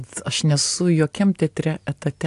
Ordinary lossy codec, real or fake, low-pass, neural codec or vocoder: AAC, 64 kbps; real; 10.8 kHz; none